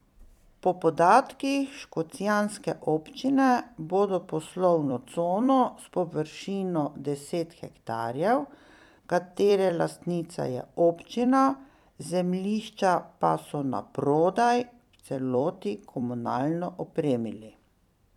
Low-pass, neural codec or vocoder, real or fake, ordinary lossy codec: 19.8 kHz; vocoder, 44.1 kHz, 128 mel bands every 256 samples, BigVGAN v2; fake; none